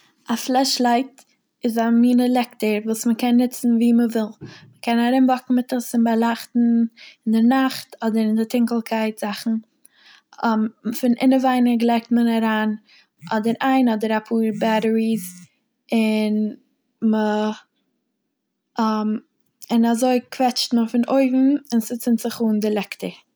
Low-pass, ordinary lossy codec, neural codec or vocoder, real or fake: none; none; none; real